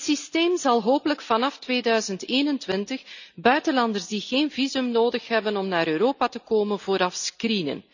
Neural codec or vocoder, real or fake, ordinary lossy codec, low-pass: none; real; none; 7.2 kHz